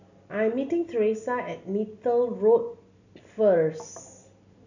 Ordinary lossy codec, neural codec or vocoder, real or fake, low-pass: none; none; real; 7.2 kHz